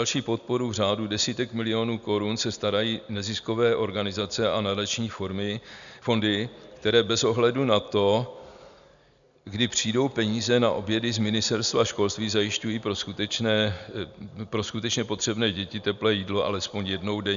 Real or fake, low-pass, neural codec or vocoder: real; 7.2 kHz; none